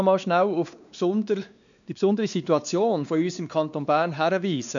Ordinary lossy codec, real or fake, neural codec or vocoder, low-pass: none; fake; codec, 16 kHz, 2 kbps, X-Codec, WavLM features, trained on Multilingual LibriSpeech; 7.2 kHz